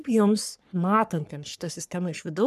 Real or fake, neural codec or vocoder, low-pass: fake; codec, 44.1 kHz, 3.4 kbps, Pupu-Codec; 14.4 kHz